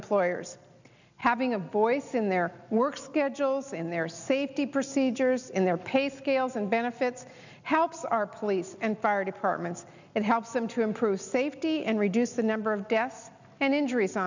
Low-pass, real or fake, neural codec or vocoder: 7.2 kHz; real; none